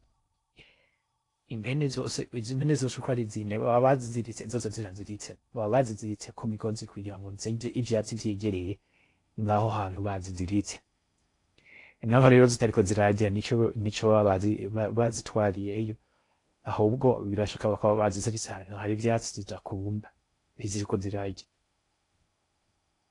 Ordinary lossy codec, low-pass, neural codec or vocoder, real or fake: AAC, 48 kbps; 10.8 kHz; codec, 16 kHz in and 24 kHz out, 0.6 kbps, FocalCodec, streaming, 4096 codes; fake